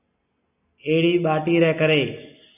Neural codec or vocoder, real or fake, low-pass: none; real; 3.6 kHz